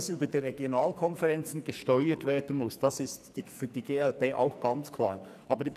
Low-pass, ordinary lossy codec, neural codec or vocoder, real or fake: 14.4 kHz; none; codec, 44.1 kHz, 2.6 kbps, SNAC; fake